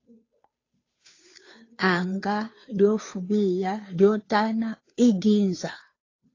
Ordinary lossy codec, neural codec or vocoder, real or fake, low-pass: AAC, 32 kbps; codec, 16 kHz, 2 kbps, FunCodec, trained on Chinese and English, 25 frames a second; fake; 7.2 kHz